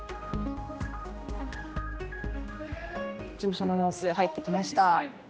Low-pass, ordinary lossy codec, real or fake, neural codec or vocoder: none; none; fake; codec, 16 kHz, 1 kbps, X-Codec, HuBERT features, trained on general audio